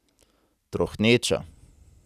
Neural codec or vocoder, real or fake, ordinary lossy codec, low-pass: none; real; none; 14.4 kHz